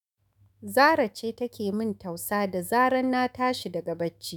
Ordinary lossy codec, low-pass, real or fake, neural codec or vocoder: none; none; fake; autoencoder, 48 kHz, 128 numbers a frame, DAC-VAE, trained on Japanese speech